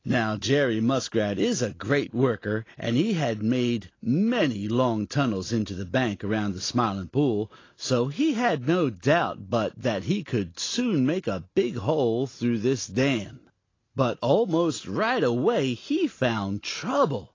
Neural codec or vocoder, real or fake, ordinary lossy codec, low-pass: none; real; AAC, 32 kbps; 7.2 kHz